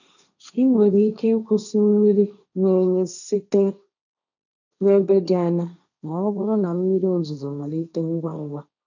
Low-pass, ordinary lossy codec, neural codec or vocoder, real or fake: 7.2 kHz; none; codec, 16 kHz, 1.1 kbps, Voila-Tokenizer; fake